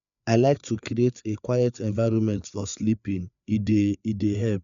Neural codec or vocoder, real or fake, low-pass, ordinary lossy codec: codec, 16 kHz, 4 kbps, FreqCodec, larger model; fake; 7.2 kHz; none